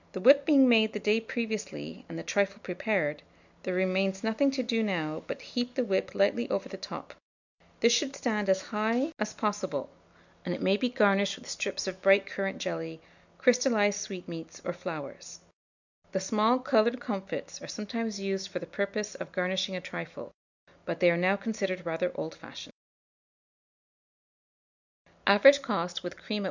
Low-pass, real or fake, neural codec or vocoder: 7.2 kHz; real; none